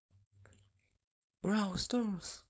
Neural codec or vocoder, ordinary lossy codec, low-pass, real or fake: codec, 16 kHz, 4.8 kbps, FACodec; none; none; fake